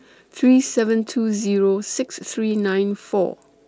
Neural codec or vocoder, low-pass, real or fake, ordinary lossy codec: none; none; real; none